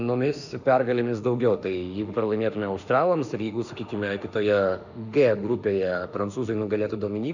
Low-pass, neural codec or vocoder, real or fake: 7.2 kHz; autoencoder, 48 kHz, 32 numbers a frame, DAC-VAE, trained on Japanese speech; fake